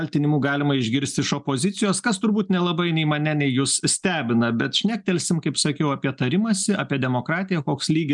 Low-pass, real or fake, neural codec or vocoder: 10.8 kHz; real; none